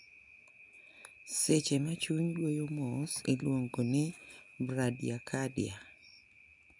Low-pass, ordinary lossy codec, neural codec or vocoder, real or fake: 10.8 kHz; none; none; real